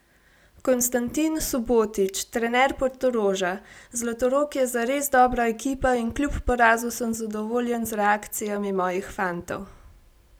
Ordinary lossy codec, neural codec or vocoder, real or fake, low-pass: none; none; real; none